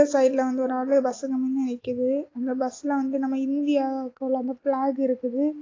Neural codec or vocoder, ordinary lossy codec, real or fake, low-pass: none; AAC, 32 kbps; real; 7.2 kHz